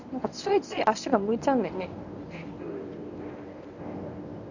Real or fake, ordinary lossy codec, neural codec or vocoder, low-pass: fake; none; codec, 24 kHz, 0.9 kbps, WavTokenizer, medium speech release version 1; 7.2 kHz